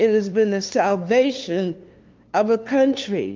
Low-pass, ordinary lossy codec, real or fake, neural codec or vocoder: 7.2 kHz; Opus, 24 kbps; fake; codec, 16 kHz, 2 kbps, FunCodec, trained on LibriTTS, 25 frames a second